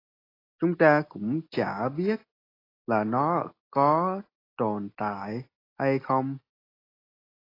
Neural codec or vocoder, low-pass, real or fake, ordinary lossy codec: none; 5.4 kHz; real; AAC, 24 kbps